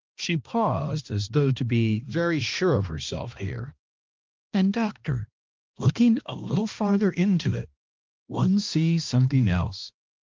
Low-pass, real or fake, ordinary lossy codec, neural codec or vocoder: 7.2 kHz; fake; Opus, 24 kbps; codec, 16 kHz, 1 kbps, X-Codec, HuBERT features, trained on balanced general audio